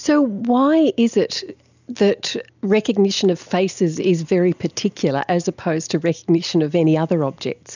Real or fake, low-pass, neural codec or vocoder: real; 7.2 kHz; none